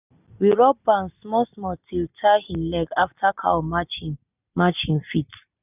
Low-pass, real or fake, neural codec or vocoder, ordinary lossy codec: 3.6 kHz; real; none; none